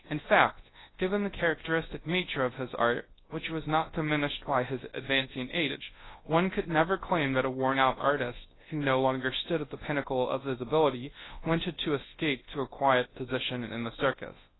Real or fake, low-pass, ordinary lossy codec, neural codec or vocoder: fake; 7.2 kHz; AAC, 16 kbps; codec, 24 kHz, 0.9 kbps, WavTokenizer, large speech release